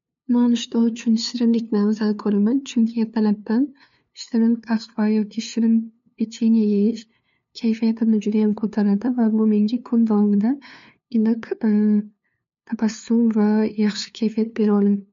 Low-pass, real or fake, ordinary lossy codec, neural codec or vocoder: 7.2 kHz; fake; MP3, 48 kbps; codec, 16 kHz, 2 kbps, FunCodec, trained on LibriTTS, 25 frames a second